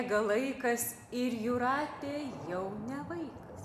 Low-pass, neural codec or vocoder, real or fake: 14.4 kHz; none; real